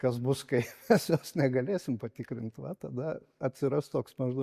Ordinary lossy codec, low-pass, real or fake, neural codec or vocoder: MP3, 64 kbps; 14.4 kHz; real; none